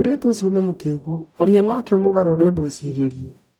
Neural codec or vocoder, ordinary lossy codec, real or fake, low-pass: codec, 44.1 kHz, 0.9 kbps, DAC; none; fake; 19.8 kHz